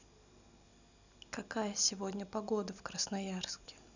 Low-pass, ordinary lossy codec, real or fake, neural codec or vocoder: 7.2 kHz; none; real; none